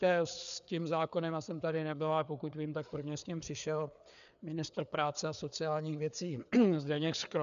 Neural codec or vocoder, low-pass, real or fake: codec, 16 kHz, 4 kbps, FreqCodec, larger model; 7.2 kHz; fake